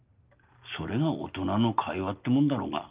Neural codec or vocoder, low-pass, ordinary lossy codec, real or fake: none; 3.6 kHz; Opus, 32 kbps; real